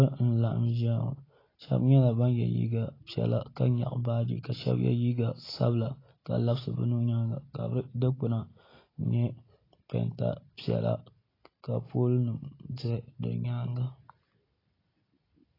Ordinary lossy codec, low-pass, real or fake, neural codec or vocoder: AAC, 24 kbps; 5.4 kHz; real; none